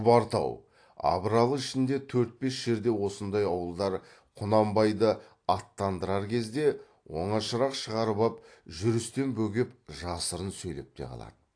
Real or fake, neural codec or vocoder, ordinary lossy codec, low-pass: real; none; AAC, 48 kbps; 9.9 kHz